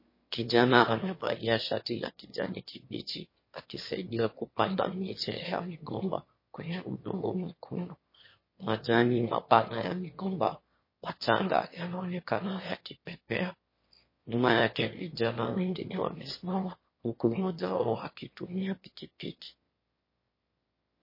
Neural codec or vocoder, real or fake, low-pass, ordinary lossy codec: autoencoder, 22.05 kHz, a latent of 192 numbers a frame, VITS, trained on one speaker; fake; 5.4 kHz; MP3, 24 kbps